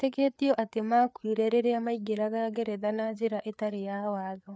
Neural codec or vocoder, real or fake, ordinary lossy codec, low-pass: codec, 16 kHz, 4 kbps, FreqCodec, larger model; fake; none; none